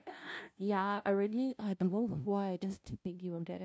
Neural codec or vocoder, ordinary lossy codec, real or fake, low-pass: codec, 16 kHz, 0.5 kbps, FunCodec, trained on LibriTTS, 25 frames a second; none; fake; none